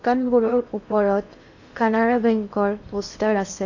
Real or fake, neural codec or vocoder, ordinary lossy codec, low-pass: fake; codec, 16 kHz in and 24 kHz out, 0.8 kbps, FocalCodec, streaming, 65536 codes; none; 7.2 kHz